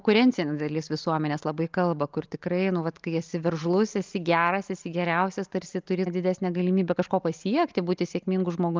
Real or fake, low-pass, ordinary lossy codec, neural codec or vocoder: real; 7.2 kHz; Opus, 24 kbps; none